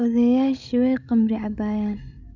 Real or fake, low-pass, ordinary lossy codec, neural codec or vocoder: fake; 7.2 kHz; none; codec, 16 kHz, 16 kbps, FreqCodec, larger model